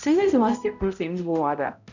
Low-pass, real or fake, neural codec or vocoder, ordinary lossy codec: 7.2 kHz; fake; codec, 16 kHz, 0.5 kbps, X-Codec, HuBERT features, trained on balanced general audio; none